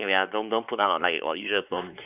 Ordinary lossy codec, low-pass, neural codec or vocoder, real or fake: none; 3.6 kHz; codec, 16 kHz, 4 kbps, X-Codec, WavLM features, trained on Multilingual LibriSpeech; fake